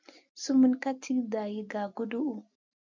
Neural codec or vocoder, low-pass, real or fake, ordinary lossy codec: none; 7.2 kHz; real; MP3, 64 kbps